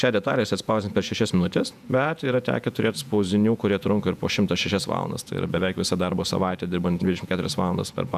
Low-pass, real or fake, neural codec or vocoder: 14.4 kHz; fake; vocoder, 48 kHz, 128 mel bands, Vocos